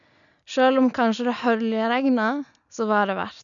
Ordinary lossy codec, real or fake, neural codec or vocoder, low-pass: none; real; none; 7.2 kHz